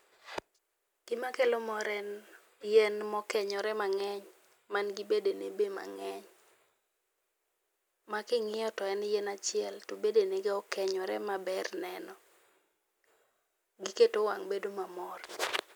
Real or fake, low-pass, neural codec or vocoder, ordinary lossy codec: real; none; none; none